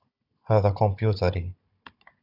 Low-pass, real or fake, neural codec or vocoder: 5.4 kHz; fake; codec, 16 kHz, 16 kbps, FunCodec, trained on Chinese and English, 50 frames a second